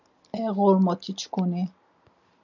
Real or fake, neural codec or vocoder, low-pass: real; none; 7.2 kHz